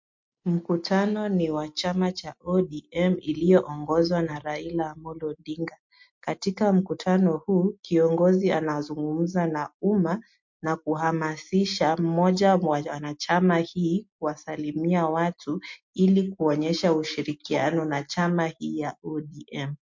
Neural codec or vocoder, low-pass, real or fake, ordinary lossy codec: none; 7.2 kHz; real; MP3, 48 kbps